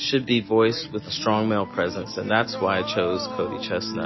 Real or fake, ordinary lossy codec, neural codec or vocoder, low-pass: real; MP3, 24 kbps; none; 7.2 kHz